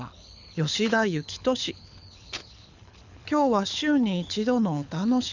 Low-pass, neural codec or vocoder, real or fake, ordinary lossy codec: 7.2 kHz; codec, 24 kHz, 6 kbps, HILCodec; fake; none